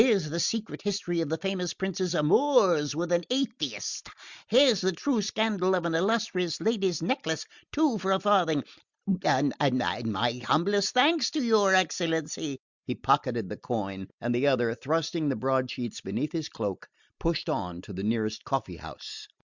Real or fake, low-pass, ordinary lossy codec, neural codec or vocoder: real; 7.2 kHz; Opus, 64 kbps; none